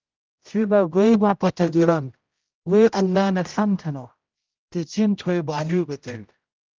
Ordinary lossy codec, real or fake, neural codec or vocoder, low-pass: Opus, 24 kbps; fake; codec, 16 kHz, 0.5 kbps, X-Codec, HuBERT features, trained on general audio; 7.2 kHz